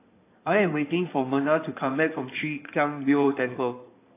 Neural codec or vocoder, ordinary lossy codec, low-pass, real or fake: codec, 16 kHz in and 24 kHz out, 2.2 kbps, FireRedTTS-2 codec; AAC, 24 kbps; 3.6 kHz; fake